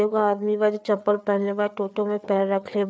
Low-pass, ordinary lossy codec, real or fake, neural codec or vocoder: none; none; fake; codec, 16 kHz, 4 kbps, FreqCodec, larger model